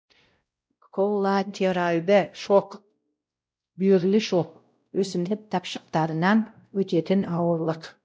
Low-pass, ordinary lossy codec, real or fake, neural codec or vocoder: none; none; fake; codec, 16 kHz, 0.5 kbps, X-Codec, WavLM features, trained on Multilingual LibriSpeech